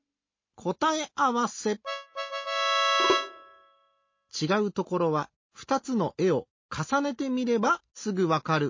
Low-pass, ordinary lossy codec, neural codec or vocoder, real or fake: 7.2 kHz; MP3, 32 kbps; none; real